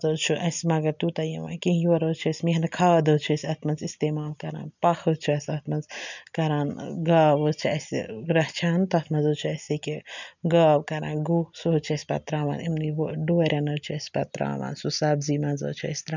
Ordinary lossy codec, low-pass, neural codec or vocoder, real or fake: none; 7.2 kHz; none; real